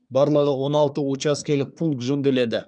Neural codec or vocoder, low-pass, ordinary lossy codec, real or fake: codec, 24 kHz, 1 kbps, SNAC; 9.9 kHz; none; fake